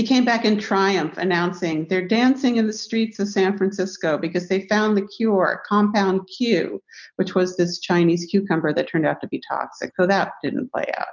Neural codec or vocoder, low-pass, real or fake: none; 7.2 kHz; real